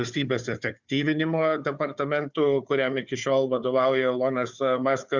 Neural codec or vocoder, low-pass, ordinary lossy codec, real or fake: codec, 16 kHz, 4 kbps, FunCodec, trained on Chinese and English, 50 frames a second; 7.2 kHz; Opus, 64 kbps; fake